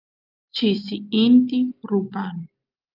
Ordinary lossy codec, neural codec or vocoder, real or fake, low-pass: Opus, 32 kbps; none; real; 5.4 kHz